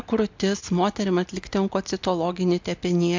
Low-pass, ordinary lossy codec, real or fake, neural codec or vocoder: 7.2 kHz; MP3, 64 kbps; real; none